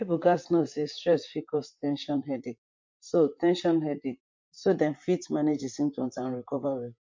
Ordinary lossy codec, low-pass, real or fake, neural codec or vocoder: MP3, 48 kbps; 7.2 kHz; fake; vocoder, 44.1 kHz, 128 mel bands, Pupu-Vocoder